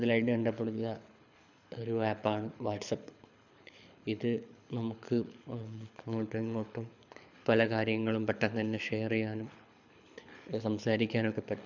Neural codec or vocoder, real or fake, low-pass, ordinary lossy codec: codec, 24 kHz, 6 kbps, HILCodec; fake; 7.2 kHz; none